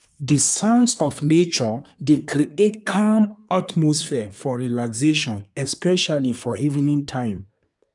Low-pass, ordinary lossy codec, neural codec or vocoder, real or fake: 10.8 kHz; none; codec, 24 kHz, 1 kbps, SNAC; fake